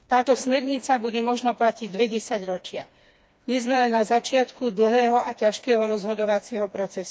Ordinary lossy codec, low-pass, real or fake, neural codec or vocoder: none; none; fake; codec, 16 kHz, 2 kbps, FreqCodec, smaller model